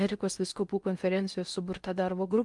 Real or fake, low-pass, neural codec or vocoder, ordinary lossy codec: fake; 10.8 kHz; codec, 16 kHz in and 24 kHz out, 0.8 kbps, FocalCodec, streaming, 65536 codes; Opus, 32 kbps